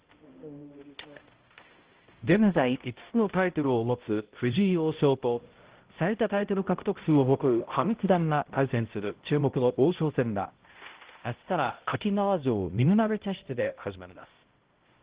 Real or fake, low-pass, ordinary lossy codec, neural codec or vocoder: fake; 3.6 kHz; Opus, 16 kbps; codec, 16 kHz, 0.5 kbps, X-Codec, HuBERT features, trained on balanced general audio